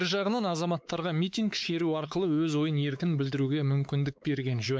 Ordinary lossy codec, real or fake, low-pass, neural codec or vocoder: none; fake; none; codec, 16 kHz, 4 kbps, X-Codec, WavLM features, trained on Multilingual LibriSpeech